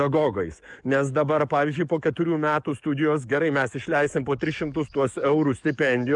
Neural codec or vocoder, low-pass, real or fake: codec, 44.1 kHz, 7.8 kbps, DAC; 10.8 kHz; fake